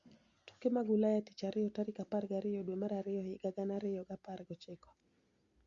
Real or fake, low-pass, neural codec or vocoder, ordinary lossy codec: real; 7.2 kHz; none; Opus, 64 kbps